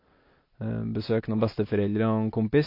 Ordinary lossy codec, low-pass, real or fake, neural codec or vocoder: MP3, 32 kbps; 5.4 kHz; real; none